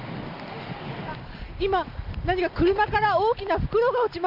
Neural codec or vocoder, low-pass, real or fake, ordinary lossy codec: none; 5.4 kHz; real; none